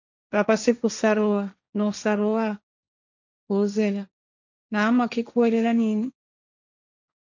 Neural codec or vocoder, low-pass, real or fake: codec, 16 kHz, 1.1 kbps, Voila-Tokenizer; 7.2 kHz; fake